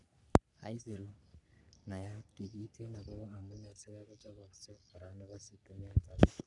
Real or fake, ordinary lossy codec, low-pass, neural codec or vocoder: fake; none; 10.8 kHz; codec, 44.1 kHz, 3.4 kbps, Pupu-Codec